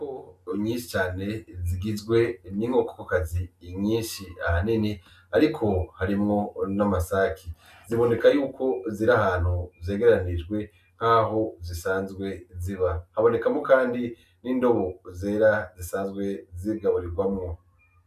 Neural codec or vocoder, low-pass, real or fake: none; 14.4 kHz; real